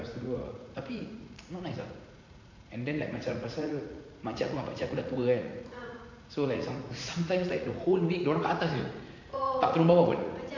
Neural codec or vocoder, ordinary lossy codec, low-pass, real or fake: vocoder, 44.1 kHz, 80 mel bands, Vocos; MP3, 48 kbps; 7.2 kHz; fake